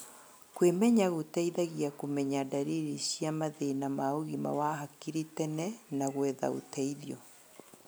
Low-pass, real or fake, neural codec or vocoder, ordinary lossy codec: none; real; none; none